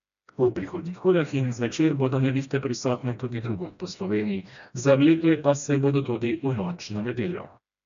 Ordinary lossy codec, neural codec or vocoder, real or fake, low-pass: none; codec, 16 kHz, 1 kbps, FreqCodec, smaller model; fake; 7.2 kHz